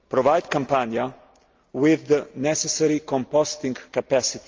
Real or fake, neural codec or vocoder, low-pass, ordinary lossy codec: real; none; 7.2 kHz; Opus, 32 kbps